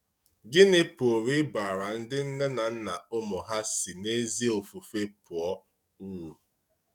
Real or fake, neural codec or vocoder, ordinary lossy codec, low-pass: fake; codec, 44.1 kHz, 7.8 kbps, DAC; none; 19.8 kHz